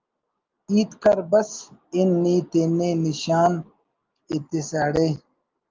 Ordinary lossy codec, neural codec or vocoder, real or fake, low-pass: Opus, 32 kbps; none; real; 7.2 kHz